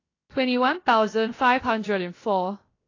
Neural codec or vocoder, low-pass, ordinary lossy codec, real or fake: codec, 16 kHz, 0.7 kbps, FocalCodec; 7.2 kHz; AAC, 32 kbps; fake